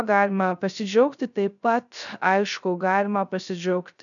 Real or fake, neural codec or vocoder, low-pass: fake; codec, 16 kHz, 0.3 kbps, FocalCodec; 7.2 kHz